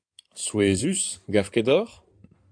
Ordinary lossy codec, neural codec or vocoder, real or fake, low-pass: AAC, 64 kbps; codec, 16 kHz in and 24 kHz out, 2.2 kbps, FireRedTTS-2 codec; fake; 9.9 kHz